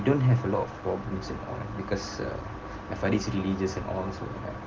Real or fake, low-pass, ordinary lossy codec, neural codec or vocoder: real; 7.2 kHz; Opus, 16 kbps; none